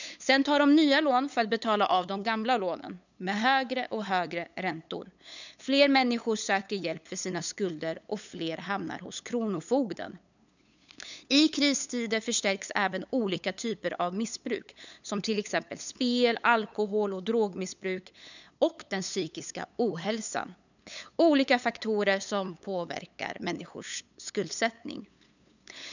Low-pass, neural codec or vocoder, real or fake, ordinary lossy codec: 7.2 kHz; codec, 16 kHz, 8 kbps, FunCodec, trained on LibriTTS, 25 frames a second; fake; none